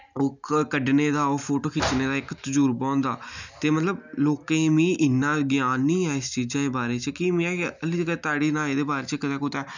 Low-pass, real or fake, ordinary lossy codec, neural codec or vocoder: 7.2 kHz; real; none; none